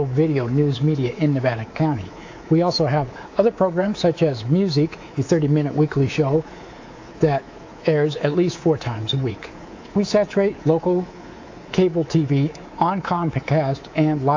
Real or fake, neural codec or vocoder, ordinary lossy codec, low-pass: fake; codec, 24 kHz, 3.1 kbps, DualCodec; AAC, 48 kbps; 7.2 kHz